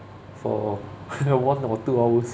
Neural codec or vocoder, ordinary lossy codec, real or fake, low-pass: none; none; real; none